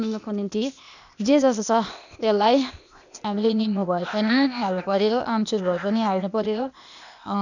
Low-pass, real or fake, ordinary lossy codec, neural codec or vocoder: 7.2 kHz; fake; none; codec, 16 kHz, 0.8 kbps, ZipCodec